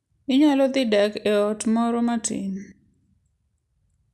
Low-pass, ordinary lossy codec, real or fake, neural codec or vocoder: none; none; real; none